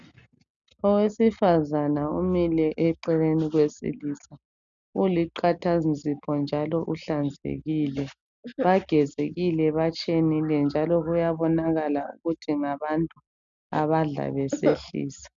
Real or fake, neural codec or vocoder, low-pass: real; none; 7.2 kHz